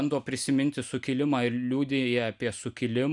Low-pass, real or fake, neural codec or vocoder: 10.8 kHz; real; none